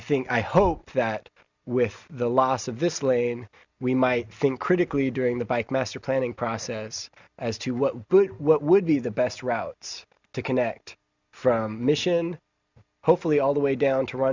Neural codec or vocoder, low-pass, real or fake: none; 7.2 kHz; real